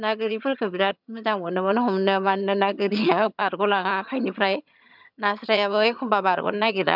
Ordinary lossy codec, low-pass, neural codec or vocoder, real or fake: none; 5.4 kHz; vocoder, 22.05 kHz, 80 mel bands, HiFi-GAN; fake